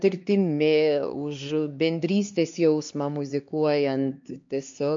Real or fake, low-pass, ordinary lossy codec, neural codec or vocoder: fake; 7.2 kHz; MP3, 48 kbps; codec, 16 kHz, 2 kbps, X-Codec, HuBERT features, trained on LibriSpeech